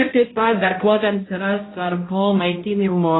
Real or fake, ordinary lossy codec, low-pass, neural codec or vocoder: fake; AAC, 16 kbps; 7.2 kHz; codec, 16 kHz, 0.5 kbps, X-Codec, HuBERT features, trained on balanced general audio